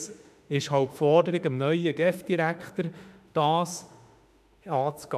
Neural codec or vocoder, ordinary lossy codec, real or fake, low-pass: autoencoder, 48 kHz, 32 numbers a frame, DAC-VAE, trained on Japanese speech; none; fake; 14.4 kHz